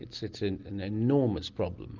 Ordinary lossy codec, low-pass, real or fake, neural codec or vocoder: Opus, 24 kbps; 7.2 kHz; real; none